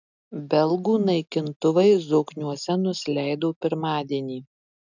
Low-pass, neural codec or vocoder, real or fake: 7.2 kHz; none; real